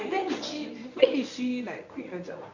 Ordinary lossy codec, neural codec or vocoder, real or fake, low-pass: none; codec, 24 kHz, 0.9 kbps, WavTokenizer, medium speech release version 1; fake; 7.2 kHz